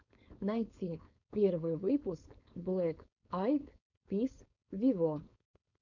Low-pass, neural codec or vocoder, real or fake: 7.2 kHz; codec, 16 kHz, 4.8 kbps, FACodec; fake